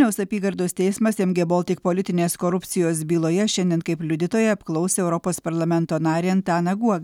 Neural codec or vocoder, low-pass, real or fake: none; 19.8 kHz; real